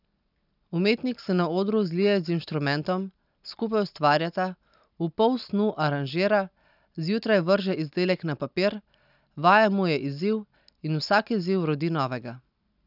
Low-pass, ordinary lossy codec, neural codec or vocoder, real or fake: 5.4 kHz; none; none; real